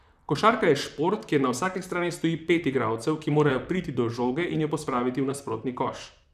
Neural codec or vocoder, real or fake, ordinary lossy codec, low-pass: vocoder, 44.1 kHz, 128 mel bands, Pupu-Vocoder; fake; none; 14.4 kHz